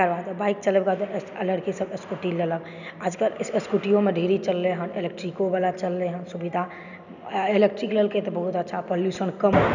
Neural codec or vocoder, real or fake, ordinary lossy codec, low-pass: none; real; none; 7.2 kHz